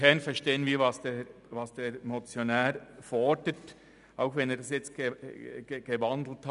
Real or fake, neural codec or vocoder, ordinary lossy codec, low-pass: real; none; none; 10.8 kHz